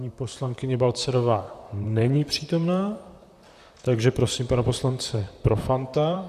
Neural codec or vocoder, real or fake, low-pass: vocoder, 44.1 kHz, 128 mel bands, Pupu-Vocoder; fake; 14.4 kHz